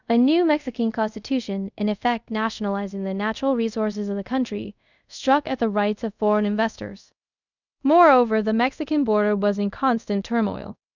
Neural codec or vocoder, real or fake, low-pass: codec, 24 kHz, 0.5 kbps, DualCodec; fake; 7.2 kHz